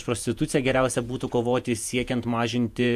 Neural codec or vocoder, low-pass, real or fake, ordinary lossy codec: vocoder, 48 kHz, 128 mel bands, Vocos; 14.4 kHz; fake; AAC, 96 kbps